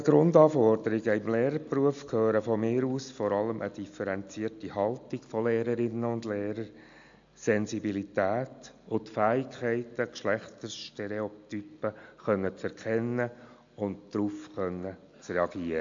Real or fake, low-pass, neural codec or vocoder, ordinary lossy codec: real; 7.2 kHz; none; AAC, 64 kbps